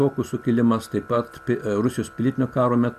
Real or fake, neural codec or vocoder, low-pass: real; none; 14.4 kHz